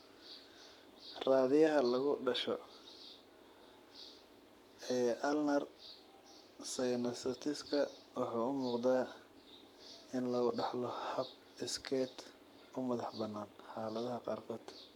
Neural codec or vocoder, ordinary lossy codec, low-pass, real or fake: codec, 44.1 kHz, 7.8 kbps, Pupu-Codec; none; 19.8 kHz; fake